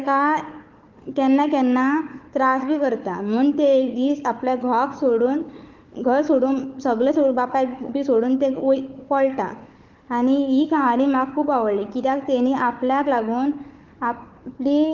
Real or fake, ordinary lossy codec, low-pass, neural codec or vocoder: fake; Opus, 32 kbps; 7.2 kHz; codec, 16 kHz, 4 kbps, FunCodec, trained on Chinese and English, 50 frames a second